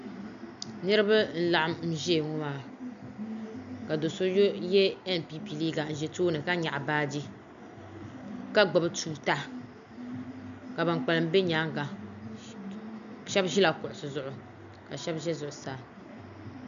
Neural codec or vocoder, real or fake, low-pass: none; real; 7.2 kHz